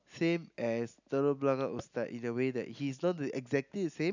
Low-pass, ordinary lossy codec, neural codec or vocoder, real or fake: 7.2 kHz; none; none; real